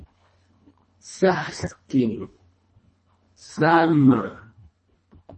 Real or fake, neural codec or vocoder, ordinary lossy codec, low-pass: fake; codec, 24 kHz, 1.5 kbps, HILCodec; MP3, 32 kbps; 10.8 kHz